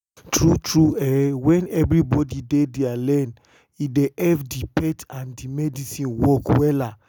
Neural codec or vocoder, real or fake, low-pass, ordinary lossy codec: none; real; none; none